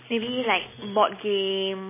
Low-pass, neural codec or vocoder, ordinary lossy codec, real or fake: 3.6 kHz; none; MP3, 16 kbps; real